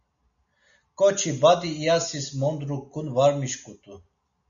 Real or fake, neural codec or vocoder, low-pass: real; none; 7.2 kHz